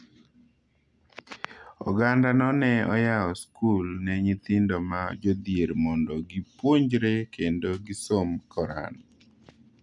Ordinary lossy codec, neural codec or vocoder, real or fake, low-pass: none; none; real; 10.8 kHz